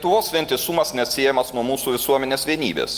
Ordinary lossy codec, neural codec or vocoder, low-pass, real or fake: Opus, 32 kbps; none; 14.4 kHz; real